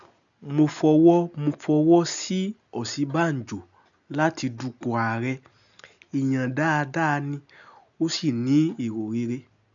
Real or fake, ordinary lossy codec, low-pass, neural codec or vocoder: real; none; 7.2 kHz; none